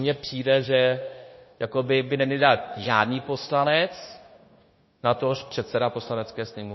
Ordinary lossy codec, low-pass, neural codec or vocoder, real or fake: MP3, 24 kbps; 7.2 kHz; codec, 16 kHz, 0.9 kbps, LongCat-Audio-Codec; fake